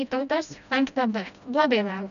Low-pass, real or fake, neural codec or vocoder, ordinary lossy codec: 7.2 kHz; fake; codec, 16 kHz, 1 kbps, FreqCodec, smaller model; MP3, 96 kbps